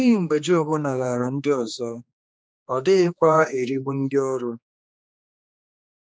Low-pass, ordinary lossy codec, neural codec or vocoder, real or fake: none; none; codec, 16 kHz, 2 kbps, X-Codec, HuBERT features, trained on general audio; fake